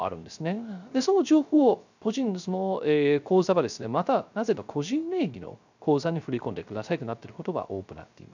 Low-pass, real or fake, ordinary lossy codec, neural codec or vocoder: 7.2 kHz; fake; none; codec, 16 kHz, 0.3 kbps, FocalCodec